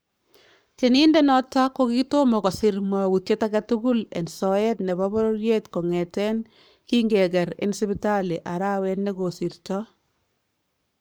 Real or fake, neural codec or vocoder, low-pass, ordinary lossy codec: fake; codec, 44.1 kHz, 7.8 kbps, Pupu-Codec; none; none